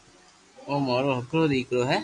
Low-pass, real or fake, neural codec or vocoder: 10.8 kHz; real; none